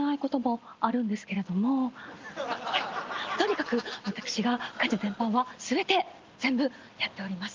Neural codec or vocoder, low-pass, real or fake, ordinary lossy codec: none; 7.2 kHz; real; Opus, 32 kbps